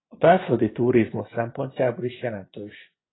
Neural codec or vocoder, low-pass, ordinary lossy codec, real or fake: vocoder, 24 kHz, 100 mel bands, Vocos; 7.2 kHz; AAC, 16 kbps; fake